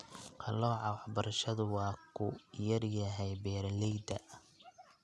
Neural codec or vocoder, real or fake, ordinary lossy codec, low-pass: none; real; none; none